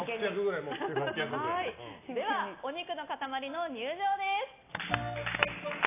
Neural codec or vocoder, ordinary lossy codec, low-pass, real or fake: none; AAC, 24 kbps; 3.6 kHz; real